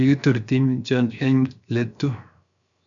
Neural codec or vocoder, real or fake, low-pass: codec, 16 kHz, 0.7 kbps, FocalCodec; fake; 7.2 kHz